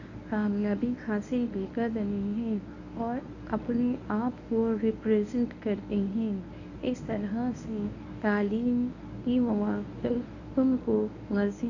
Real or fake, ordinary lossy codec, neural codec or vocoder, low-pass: fake; none; codec, 24 kHz, 0.9 kbps, WavTokenizer, medium speech release version 1; 7.2 kHz